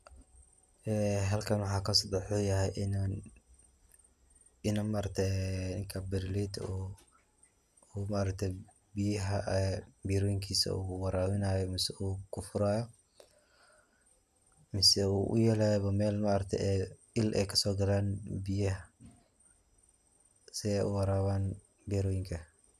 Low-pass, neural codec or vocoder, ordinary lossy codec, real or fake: 14.4 kHz; none; none; real